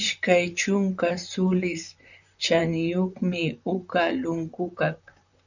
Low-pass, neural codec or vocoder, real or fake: 7.2 kHz; vocoder, 44.1 kHz, 128 mel bands, Pupu-Vocoder; fake